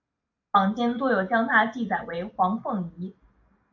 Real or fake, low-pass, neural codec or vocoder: real; 7.2 kHz; none